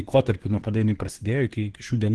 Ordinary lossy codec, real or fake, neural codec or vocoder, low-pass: Opus, 16 kbps; fake; codec, 24 kHz, 1 kbps, SNAC; 10.8 kHz